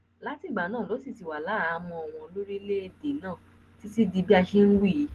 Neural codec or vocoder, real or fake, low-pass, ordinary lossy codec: none; real; 14.4 kHz; Opus, 16 kbps